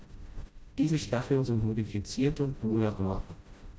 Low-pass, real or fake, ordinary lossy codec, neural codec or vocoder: none; fake; none; codec, 16 kHz, 0.5 kbps, FreqCodec, smaller model